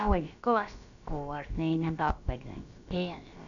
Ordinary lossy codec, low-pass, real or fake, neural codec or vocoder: none; 7.2 kHz; fake; codec, 16 kHz, about 1 kbps, DyCAST, with the encoder's durations